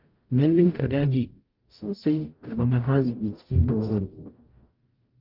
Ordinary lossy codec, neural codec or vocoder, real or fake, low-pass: Opus, 24 kbps; codec, 44.1 kHz, 0.9 kbps, DAC; fake; 5.4 kHz